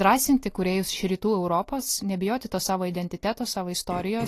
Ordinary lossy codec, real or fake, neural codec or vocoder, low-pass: AAC, 48 kbps; real; none; 14.4 kHz